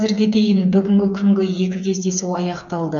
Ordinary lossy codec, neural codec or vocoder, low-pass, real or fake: none; codec, 16 kHz, 4 kbps, FreqCodec, smaller model; 7.2 kHz; fake